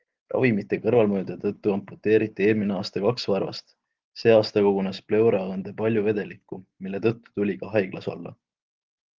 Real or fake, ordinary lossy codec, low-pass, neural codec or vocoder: real; Opus, 16 kbps; 7.2 kHz; none